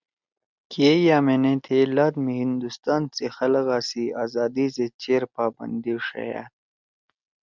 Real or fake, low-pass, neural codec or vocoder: real; 7.2 kHz; none